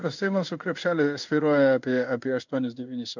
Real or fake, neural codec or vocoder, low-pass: fake; codec, 16 kHz in and 24 kHz out, 1 kbps, XY-Tokenizer; 7.2 kHz